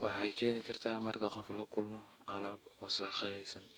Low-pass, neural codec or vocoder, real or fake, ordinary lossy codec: none; codec, 44.1 kHz, 2.6 kbps, DAC; fake; none